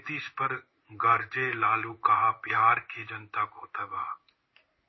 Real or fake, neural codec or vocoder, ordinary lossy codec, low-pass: fake; codec, 16 kHz in and 24 kHz out, 1 kbps, XY-Tokenizer; MP3, 24 kbps; 7.2 kHz